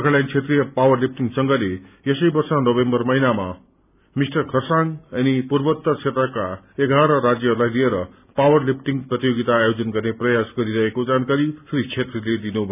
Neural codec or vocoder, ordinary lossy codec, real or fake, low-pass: none; none; real; 3.6 kHz